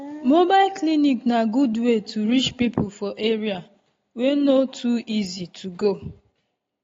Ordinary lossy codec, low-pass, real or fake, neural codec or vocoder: AAC, 32 kbps; 7.2 kHz; real; none